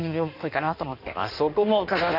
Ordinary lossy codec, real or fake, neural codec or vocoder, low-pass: none; fake; codec, 16 kHz in and 24 kHz out, 1.1 kbps, FireRedTTS-2 codec; 5.4 kHz